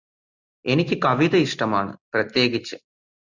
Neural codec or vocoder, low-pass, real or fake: none; 7.2 kHz; real